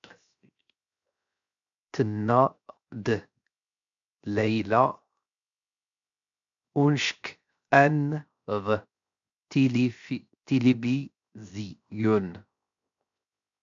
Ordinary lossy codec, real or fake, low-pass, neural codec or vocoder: AAC, 64 kbps; fake; 7.2 kHz; codec, 16 kHz, 0.7 kbps, FocalCodec